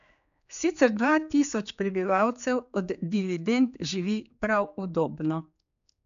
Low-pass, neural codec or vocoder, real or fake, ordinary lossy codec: 7.2 kHz; codec, 16 kHz, 4 kbps, X-Codec, HuBERT features, trained on general audio; fake; none